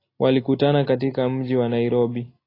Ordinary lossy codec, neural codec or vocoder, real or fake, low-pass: AAC, 24 kbps; none; real; 5.4 kHz